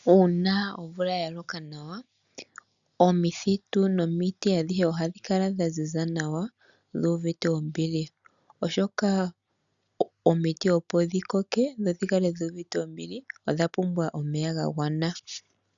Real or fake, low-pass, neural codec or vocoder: real; 7.2 kHz; none